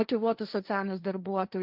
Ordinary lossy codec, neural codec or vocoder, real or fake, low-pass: Opus, 24 kbps; codec, 16 kHz, 1.1 kbps, Voila-Tokenizer; fake; 5.4 kHz